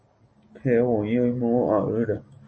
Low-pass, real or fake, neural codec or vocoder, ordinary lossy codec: 9.9 kHz; real; none; MP3, 32 kbps